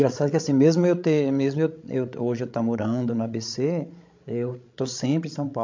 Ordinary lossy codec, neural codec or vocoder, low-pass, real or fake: MP3, 48 kbps; codec, 16 kHz, 16 kbps, FreqCodec, larger model; 7.2 kHz; fake